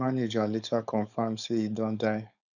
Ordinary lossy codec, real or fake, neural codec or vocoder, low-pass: none; fake; codec, 16 kHz, 4.8 kbps, FACodec; 7.2 kHz